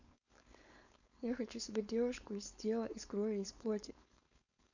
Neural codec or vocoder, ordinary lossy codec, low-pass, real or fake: codec, 16 kHz, 4.8 kbps, FACodec; none; 7.2 kHz; fake